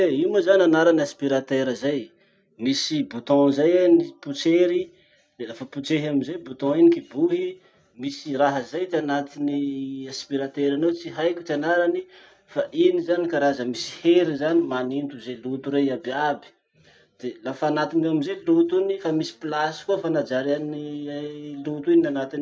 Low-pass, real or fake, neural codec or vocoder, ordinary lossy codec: none; real; none; none